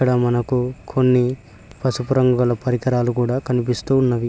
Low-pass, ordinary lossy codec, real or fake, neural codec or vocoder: none; none; real; none